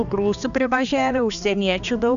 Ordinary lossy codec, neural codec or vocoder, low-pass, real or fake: MP3, 96 kbps; codec, 16 kHz, 2 kbps, X-Codec, HuBERT features, trained on balanced general audio; 7.2 kHz; fake